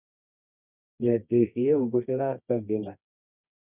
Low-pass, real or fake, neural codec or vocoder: 3.6 kHz; fake; codec, 24 kHz, 0.9 kbps, WavTokenizer, medium music audio release